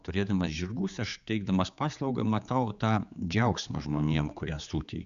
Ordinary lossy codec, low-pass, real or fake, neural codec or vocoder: Opus, 64 kbps; 7.2 kHz; fake; codec, 16 kHz, 4 kbps, X-Codec, HuBERT features, trained on general audio